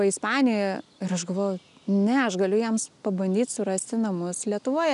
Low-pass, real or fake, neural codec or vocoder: 10.8 kHz; real; none